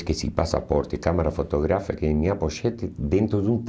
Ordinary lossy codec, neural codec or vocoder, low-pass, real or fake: none; none; none; real